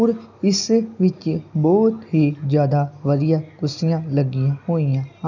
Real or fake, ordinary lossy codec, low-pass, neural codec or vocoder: real; none; 7.2 kHz; none